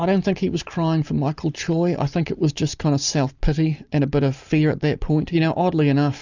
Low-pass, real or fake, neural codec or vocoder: 7.2 kHz; real; none